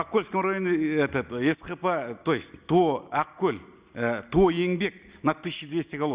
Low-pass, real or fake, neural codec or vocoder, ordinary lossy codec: 3.6 kHz; real; none; Opus, 64 kbps